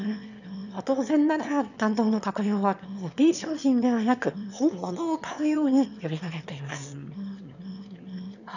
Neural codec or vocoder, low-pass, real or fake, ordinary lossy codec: autoencoder, 22.05 kHz, a latent of 192 numbers a frame, VITS, trained on one speaker; 7.2 kHz; fake; none